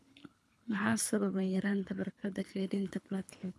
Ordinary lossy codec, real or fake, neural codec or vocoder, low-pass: none; fake; codec, 24 kHz, 3 kbps, HILCodec; none